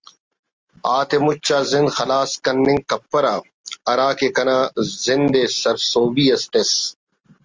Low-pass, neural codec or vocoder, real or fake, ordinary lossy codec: 7.2 kHz; none; real; Opus, 32 kbps